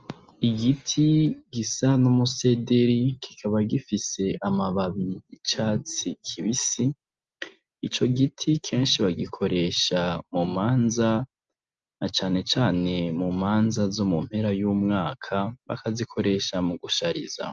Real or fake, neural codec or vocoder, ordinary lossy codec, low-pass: real; none; Opus, 24 kbps; 7.2 kHz